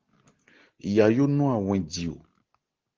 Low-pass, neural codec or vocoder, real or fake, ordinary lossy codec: 7.2 kHz; none; real; Opus, 16 kbps